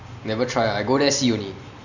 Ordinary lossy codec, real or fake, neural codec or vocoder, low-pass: none; real; none; 7.2 kHz